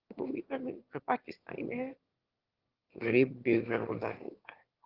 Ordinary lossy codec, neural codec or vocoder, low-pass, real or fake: Opus, 16 kbps; autoencoder, 22.05 kHz, a latent of 192 numbers a frame, VITS, trained on one speaker; 5.4 kHz; fake